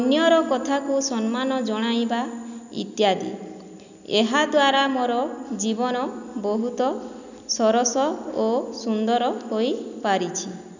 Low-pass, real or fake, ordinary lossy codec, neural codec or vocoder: 7.2 kHz; real; none; none